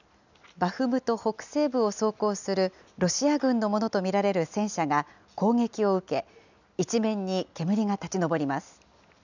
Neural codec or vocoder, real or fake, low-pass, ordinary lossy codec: none; real; 7.2 kHz; none